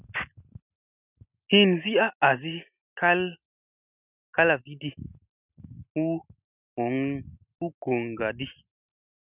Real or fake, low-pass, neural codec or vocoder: real; 3.6 kHz; none